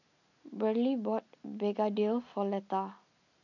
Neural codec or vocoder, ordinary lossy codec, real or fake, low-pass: none; none; real; 7.2 kHz